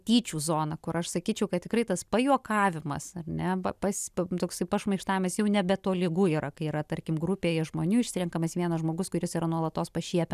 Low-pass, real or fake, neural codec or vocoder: 14.4 kHz; real; none